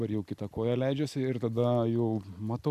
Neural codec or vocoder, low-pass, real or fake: none; 14.4 kHz; real